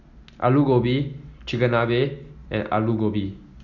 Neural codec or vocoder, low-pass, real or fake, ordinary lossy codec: none; 7.2 kHz; real; none